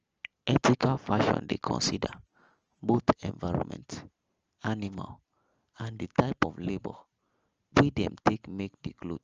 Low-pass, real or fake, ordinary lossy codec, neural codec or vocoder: 7.2 kHz; real; Opus, 24 kbps; none